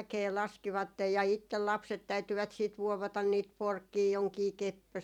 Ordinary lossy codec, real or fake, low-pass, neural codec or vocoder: none; fake; 19.8 kHz; vocoder, 44.1 kHz, 128 mel bands every 256 samples, BigVGAN v2